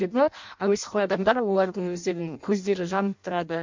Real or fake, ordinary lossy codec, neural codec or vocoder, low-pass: fake; MP3, 64 kbps; codec, 16 kHz in and 24 kHz out, 0.6 kbps, FireRedTTS-2 codec; 7.2 kHz